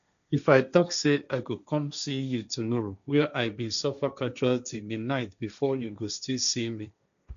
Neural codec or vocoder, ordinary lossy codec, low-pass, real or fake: codec, 16 kHz, 1.1 kbps, Voila-Tokenizer; none; 7.2 kHz; fake